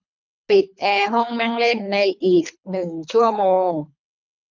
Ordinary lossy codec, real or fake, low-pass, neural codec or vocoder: none; fake; 7.2 kHz; codec, 24 kHz, 3 kbps, HILCodec